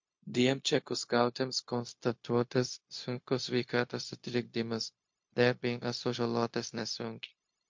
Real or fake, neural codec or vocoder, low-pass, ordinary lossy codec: fake; codec, 16 kHz, 0.4 kbps, LongCat-Audio-Codec; 7.2 kHz; MP3, 48 kbps